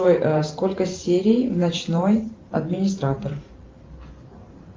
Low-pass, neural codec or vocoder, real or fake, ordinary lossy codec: 7.2 kHz; vocoder, 44.1 kHz, 128 mel bands every 512 samples, BigVGAN v2; fake; Opus, 24 kbps